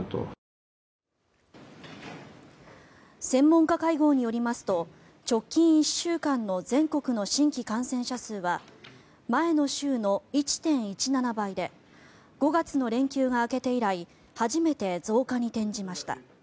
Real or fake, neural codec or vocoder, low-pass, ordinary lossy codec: real; none; none; none